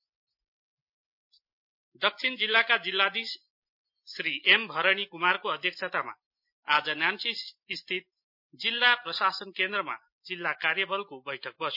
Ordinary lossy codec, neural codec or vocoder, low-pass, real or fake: MP3, 32 kbps; none; 5.4 kHz; real